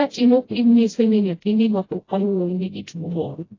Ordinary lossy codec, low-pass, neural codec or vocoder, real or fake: AAC, 32 kbps; 7.2 kHz; codec, 16 kHz, 0.5 kbps, FreqCodec, smaller model; fake